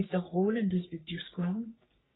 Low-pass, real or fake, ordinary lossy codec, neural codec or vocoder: 7.2 kHz; fake; AAC, 16 kbps; codec, 44.1 kHz, 3.4 kbps, Pupu-Codec